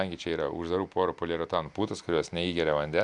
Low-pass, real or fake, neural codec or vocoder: 10.8 kHz; real; none